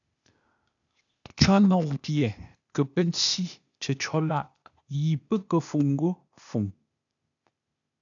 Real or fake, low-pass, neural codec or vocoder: fake; 7.2 kHz; codec, 16 kHz, 0.8 kbps, ZipCodec